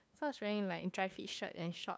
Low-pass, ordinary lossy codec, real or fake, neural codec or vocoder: none; none; fake; codec, 16 kHz, 2 kbps, FunCodec, trained on LibriTTS, 25 frames a second